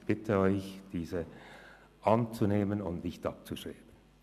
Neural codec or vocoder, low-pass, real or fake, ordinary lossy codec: none; 14.4 kHz; real; none